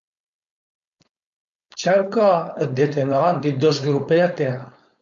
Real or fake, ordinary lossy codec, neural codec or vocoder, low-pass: fake; MP3, 48 kbps; codec, 16 kHz, 4.8 kbps, FACodec; 7.2 kHz